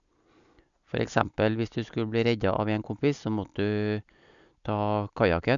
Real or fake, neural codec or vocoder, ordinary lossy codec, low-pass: real; none; none; 7.2 kHz